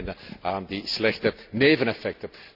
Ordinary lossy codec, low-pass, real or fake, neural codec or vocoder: none; 5.4 kHz; real; none